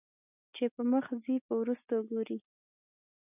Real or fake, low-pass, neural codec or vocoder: real; 3.6 kHz; none